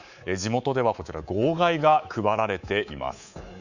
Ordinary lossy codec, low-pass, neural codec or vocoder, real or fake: none; 7.2 kHz; codec, 24 kHz, 3.1 kbps, DualCodec; fake